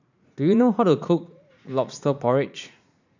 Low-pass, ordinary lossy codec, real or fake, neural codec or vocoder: 7.2 kHz; none; fake; vocoder, 44.1 kHz, 80 mel bands, Vocos